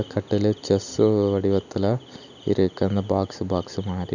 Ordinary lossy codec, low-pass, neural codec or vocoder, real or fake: none; 7.2 kHz; none; real